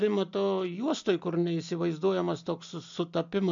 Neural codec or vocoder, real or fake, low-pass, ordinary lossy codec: none; real; 7.2 kHz; MP3, 64 kbps